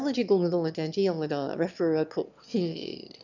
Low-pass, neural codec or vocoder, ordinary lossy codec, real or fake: 7.2 kHz; autoencoder, 22.05 kHz, a latent of 192 numbers a frame, VITS, trained on one speaker; none; fake